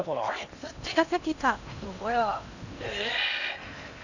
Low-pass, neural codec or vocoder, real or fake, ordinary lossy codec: 7.2 kHz; codec, 16 kHz in and 24 kHz out, 0.8 kbps, FocalCodec, streaming, 65536 codes; fake; none